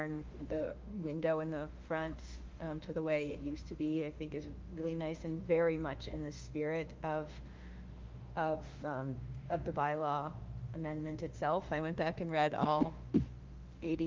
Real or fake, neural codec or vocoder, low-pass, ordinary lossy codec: fake; autoencoder, 48 kHz, 32 numbers a frame, DAC-VAE, trained on Japanese speech; 7.2 kHz; Opus, 32 kbps